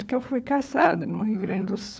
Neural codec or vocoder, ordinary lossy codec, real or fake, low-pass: codec, 16 kHz, 4 kbps, FunCodec, trained on LibriTTS, 50 frames a second; none; fake; none